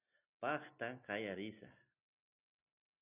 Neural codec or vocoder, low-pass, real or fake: none; 3.6 kHz; real